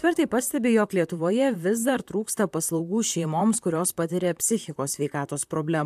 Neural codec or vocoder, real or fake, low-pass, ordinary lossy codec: vocoder, 44.1 kHz, 128 mel bands, Pupu-Vocoder; fake; 14.4 kHz; AAC, 96 kbps